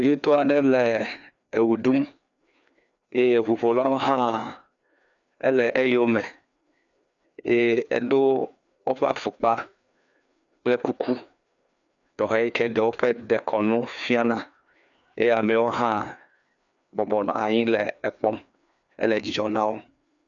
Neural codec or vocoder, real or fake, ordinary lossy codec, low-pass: codec, 16 kHz, 2 kbps, FreqCodec, larger model; fake; MP3, 96 kbps; 7.2 kHz